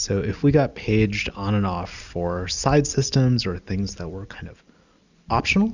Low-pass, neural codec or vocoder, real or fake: 7.2 kHz; none; real